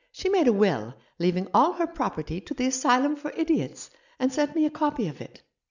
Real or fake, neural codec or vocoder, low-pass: real; none; 7.2 kHz